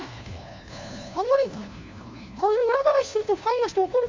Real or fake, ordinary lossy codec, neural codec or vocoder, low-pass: fake; MP3, 64 kbps; codec, 16 kHz, 1 kbps, FunCodec, trained on LibriTTS, 50 frames a second; 7.2 kHz